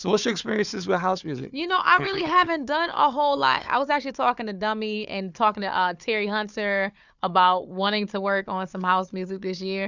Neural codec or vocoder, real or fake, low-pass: codec, 16 kHz, 4 kbps, FunCodec, trained on Chinese and English, 50 frames a second; fake; 7.2 kHz